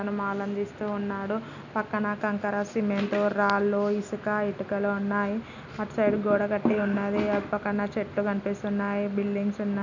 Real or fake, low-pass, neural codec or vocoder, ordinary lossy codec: real; 7.2 kHz; none; none